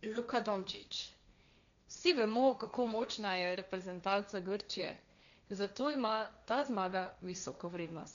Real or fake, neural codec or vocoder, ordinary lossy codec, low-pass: fake; codec, 16 kHz, 1.1 kbps, Voila-Tokenizer; none; 7.2 kHz